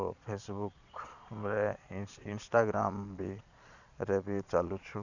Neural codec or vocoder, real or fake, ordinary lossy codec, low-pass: vocoder, 22.05 kHz, 80 mel bands, Vocos; fake; none; 7.2 kHz